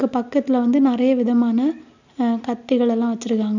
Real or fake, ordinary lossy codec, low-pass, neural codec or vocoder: real; none; 7.2 kHz; none